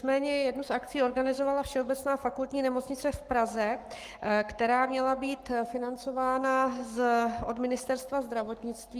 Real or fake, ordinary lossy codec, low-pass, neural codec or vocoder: fake; Opus, 32 kbps; 14.4 kHz; codec, 44.1 kHz, 7.8 kbps, Pupu-Codec